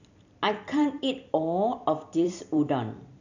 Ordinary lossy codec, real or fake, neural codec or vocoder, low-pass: none; fake; vocoder, 44.1 kHz, 128 mel bands every 256 samples, BigVGAN v2; 7.2 kHz